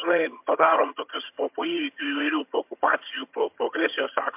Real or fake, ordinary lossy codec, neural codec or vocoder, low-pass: fake; MP3, 32 kbps; vocoder, 22.05 kHz, 80 mel bands, HiFi-GAN; 3.6 kHz